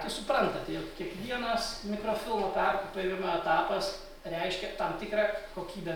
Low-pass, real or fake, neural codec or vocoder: 19.8 kHz; real; none